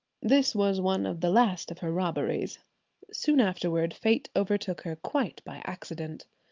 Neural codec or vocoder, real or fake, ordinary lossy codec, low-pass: none; real; Opus, 32 kbps; 7.2 kHz